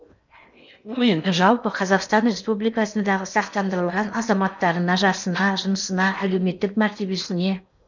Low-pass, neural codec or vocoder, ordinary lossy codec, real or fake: 7.2 kHz; codec, 16 kHz in and 24 kHz out, 0.8 kbps, FocalCodec, streaming, 65536 codes; none; fake